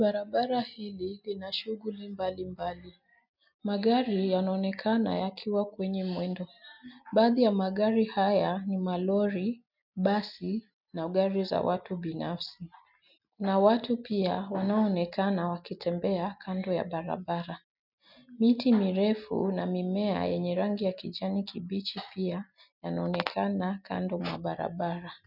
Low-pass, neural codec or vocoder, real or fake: 5.4 kHz; none; real